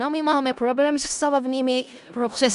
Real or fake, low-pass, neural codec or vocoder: fake; 10.8 kHz; codec, 16 kHz in and 24 kHz out, 0.4 kbps, LongCat-Audio-Codec, four codebook decoder